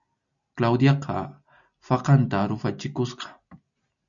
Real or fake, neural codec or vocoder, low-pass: real; none; 7.2 kHz